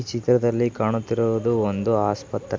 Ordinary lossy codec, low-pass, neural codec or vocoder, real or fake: none; none; none; real